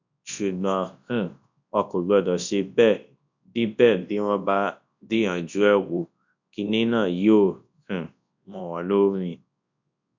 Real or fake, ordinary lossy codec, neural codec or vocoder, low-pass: fake; none; codec, 24 kHz, 0.9 kbps, WavTokenizer, large speech release; 7.2 kHz